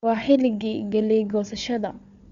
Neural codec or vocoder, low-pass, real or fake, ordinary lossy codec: codec, 16 kHz, 4 kbps, FunCodec, trained on Chinese and English, 50 frames a second; 7.2 kHz; fake; Opus, 64 kbps